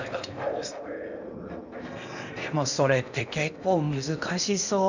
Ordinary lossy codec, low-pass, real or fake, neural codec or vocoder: none; 7.2 kHz; fake; codec, 16 kHz in and 24 kHz out, 0.8 kbps, FocalCodec, streaming, 65536 codes